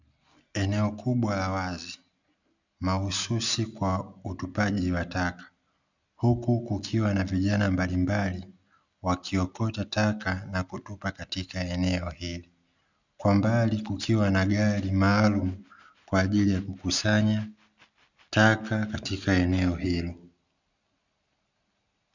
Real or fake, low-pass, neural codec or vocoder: real; 7.2 kHz; none